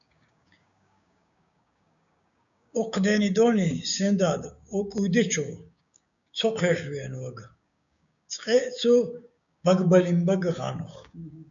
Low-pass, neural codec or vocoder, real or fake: 7.2 kHz; codec, 16 kHz, 6 kbps, DAC; fake